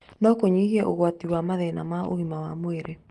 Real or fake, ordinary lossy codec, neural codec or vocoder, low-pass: real; Opus, 24 kbps; none; 10.8 kHz